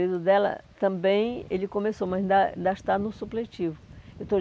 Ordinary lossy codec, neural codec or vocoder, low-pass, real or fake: none; none; none; real